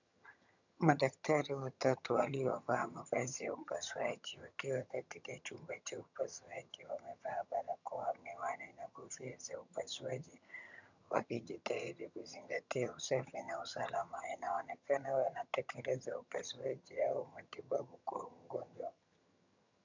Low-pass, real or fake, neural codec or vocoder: 7.2 kHz; fake; vocoder, 22.05 kHz, 80 mel bands, HiFi-GAN